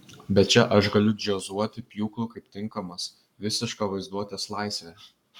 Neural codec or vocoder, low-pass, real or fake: codec, 44.1 kHz, 7.8 kbps, Pupu-Codec; 19.8 kHz; fake